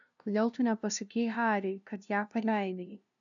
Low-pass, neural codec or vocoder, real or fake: 7.2 kHz; codec, 16 kHz, 0.5 kbps, FunCodec, trained on LibriTTS, 25 frames a second; fake